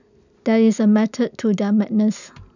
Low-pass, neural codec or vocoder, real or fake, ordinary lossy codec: 7.2 kHz; none; real; none